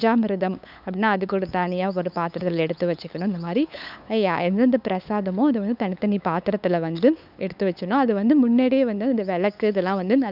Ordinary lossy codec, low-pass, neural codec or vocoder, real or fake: none; 5.4 kHz; codec, 16 kHz, 8 kbps, FunCodec, trained on LibriTTS, 25 frames a second; fake